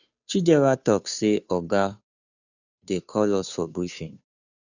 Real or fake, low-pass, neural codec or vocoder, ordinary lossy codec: fake; 7.2 kHz; codec, 16 kHz, 2 kbps, FunCodec, trained on Chinese and English, 25 frames a second; none